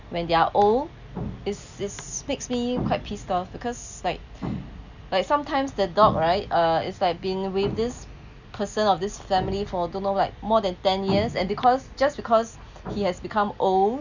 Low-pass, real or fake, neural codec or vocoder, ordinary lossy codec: 7.2 kHz; real; none; none